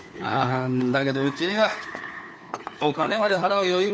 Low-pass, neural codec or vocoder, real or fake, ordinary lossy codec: none; codec, 16 kHz, 4 kbps, FunCodec, trained on LibriTTS, 50 frames a second; fake; none